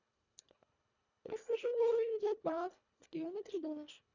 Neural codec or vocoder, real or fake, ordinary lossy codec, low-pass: codec, 24 kHz, 1.5 kbps, HILCodec; fake; none; 7.2 kHz